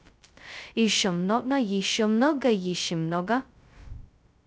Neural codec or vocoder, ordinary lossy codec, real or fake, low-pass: codec, 16 kHz, 0.2 kbps, FocalCodec; none; fake; none